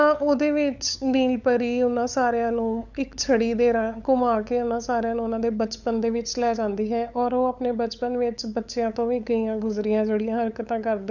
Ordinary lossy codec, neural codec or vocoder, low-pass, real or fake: none; codec, 16 kHz, 8 kbps, FunCodec, trained on LibriTTS, 25 frames a second; 7.2 kHz; fake